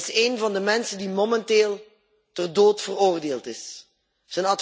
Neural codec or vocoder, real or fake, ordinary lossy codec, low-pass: none; real; none; none